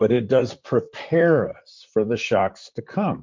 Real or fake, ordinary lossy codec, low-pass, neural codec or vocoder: fake; MP3, 48 kbps; 7.2 kHz; vocoder, 44.1 kHz, 128 mel bands, Pupu-Vocoder